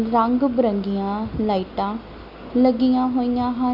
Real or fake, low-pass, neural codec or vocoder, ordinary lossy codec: real; 5.4 kHz; none; Opus, 64 kbps